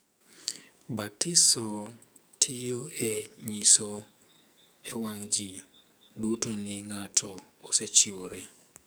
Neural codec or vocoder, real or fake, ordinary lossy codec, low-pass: codec, 44.1 kHz, 2.6 kbps, SNAC; fake; none; none